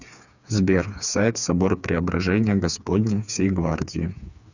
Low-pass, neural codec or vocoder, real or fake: 7.2 kHz; codec, 16 kHz, 4 kbps, FreqCodec, smaller model; fake